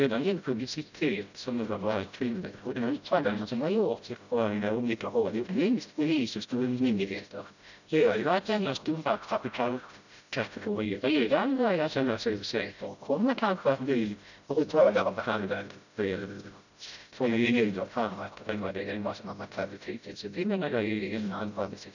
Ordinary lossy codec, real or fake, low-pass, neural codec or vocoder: none; fake; 7.2 kHz; codec, 16 kHz, 0.5 kbps, FreqCodec, smaller model